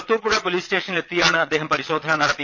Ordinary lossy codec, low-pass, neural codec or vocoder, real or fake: none; 7.2 kHz; vocoder, 22.05 kHz, 80 mel bands, Vocos; fake